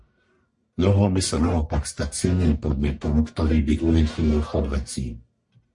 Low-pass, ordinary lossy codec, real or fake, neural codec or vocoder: 10.8 kHz; MP3, 48 kbps; fake; codec, 44.1 kHz, 1.7 kbps, Pupu-Codec